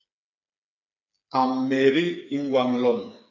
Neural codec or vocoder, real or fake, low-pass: codec, 16 kHz, 4 kbps, FreqCodec, smaller model; fake; 7.2 kHz